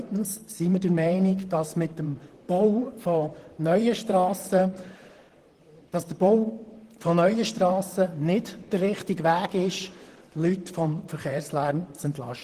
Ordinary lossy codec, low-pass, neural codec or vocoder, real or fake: Opus, 16 kbps; 14.4 kHz; vocoder, 44.1 kHz, 128 mel bands, Pupu-Vocoder; fake